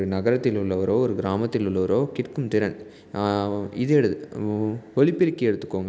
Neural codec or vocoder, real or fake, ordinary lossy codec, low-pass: none; real; none; none